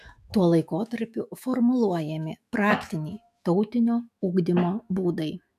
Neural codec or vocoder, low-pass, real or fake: autoencoder, 48 kHz, 128 numbers a frame, DAC-VAE, trained on Japanese speech; 14.4 kHz; fake